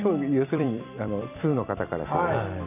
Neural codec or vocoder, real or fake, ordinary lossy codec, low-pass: vocoder, 44.1 kHz, 128 mel bands every 256 samples, BigVGAN v2; fake; none; 3.6 kHz